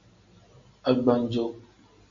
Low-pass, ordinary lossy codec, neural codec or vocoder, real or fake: 7.2 kHz; AAC, 48 kbps; none; real